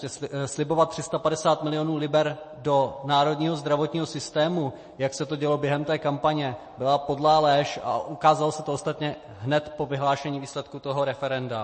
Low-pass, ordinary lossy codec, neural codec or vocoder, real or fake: 10.8 kHz; MP3, 32 kbps; none; real